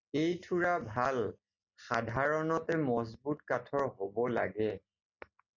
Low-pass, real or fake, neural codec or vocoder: 7.2 kHz; real; none